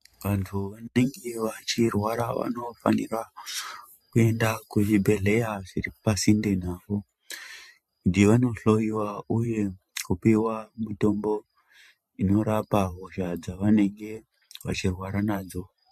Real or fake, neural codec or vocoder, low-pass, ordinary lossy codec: fake; vocoder, 44.1 kHz, 128 mel bands every 512 samples, BigVGAN v2; 14.4 kHz; MP3, 64 kbps